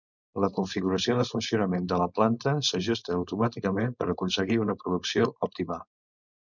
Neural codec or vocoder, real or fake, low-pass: codec, 16 kHz, 4.8 kbps, FACodec; fake; 7.2 kHz